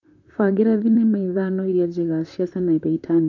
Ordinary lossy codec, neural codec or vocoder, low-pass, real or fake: none; vocoder, 44.1 kHz, 128 mel bands, Pupu-Vocoder; 7.2 kHz; fake